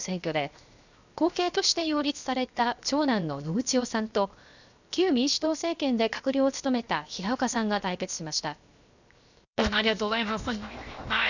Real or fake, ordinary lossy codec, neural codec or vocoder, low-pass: fake; none; codec, 16 kHz, 0.7 kbps, FocalCodec; 7.2 kHz